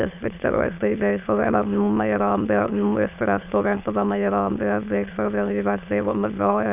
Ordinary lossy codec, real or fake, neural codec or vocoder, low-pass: none; fake; autoencoder, 22.05 kHz, a latent of 192 numbers a frame, VITS, trained on many speakers; 3.6 kHz